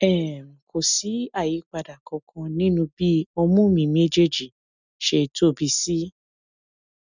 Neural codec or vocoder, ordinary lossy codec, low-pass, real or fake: none; none; 7.2 kHz; real